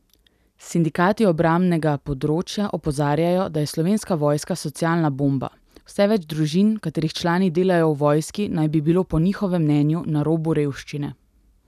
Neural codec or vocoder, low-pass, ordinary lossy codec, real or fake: none; 14.4 kHz; none; real